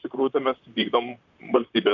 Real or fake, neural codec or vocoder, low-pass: fake; vocoder, 44.1 kHz, 128 mel bands, Pupu-Vocoder; 7.2 kHz